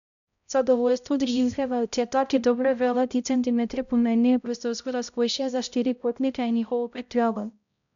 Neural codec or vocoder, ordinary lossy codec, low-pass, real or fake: codec, 16 kHz, 0.5 kbps, X-Codec, HuBERT features, trained on balanced general audio; none; 7.2 kHz; fake